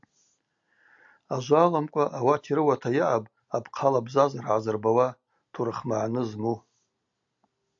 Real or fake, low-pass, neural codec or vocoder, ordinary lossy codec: real; 7.2 kHz; none; AAC, 64 kbps